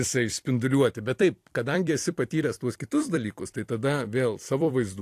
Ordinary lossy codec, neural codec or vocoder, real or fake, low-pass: AAC, 64 kbps; vocoder, 44.1 kHz, 128 mel bands every 256 samples, BigVGAN v2; fake; 14.4 kHz